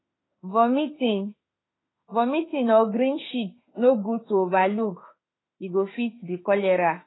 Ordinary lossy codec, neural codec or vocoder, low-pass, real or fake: AAC, 16 kbps; autoencoder, 48 kHz, 32 numbers a frame, DAC-VAE, trained on Japanese speech; 7.2 kHz; fake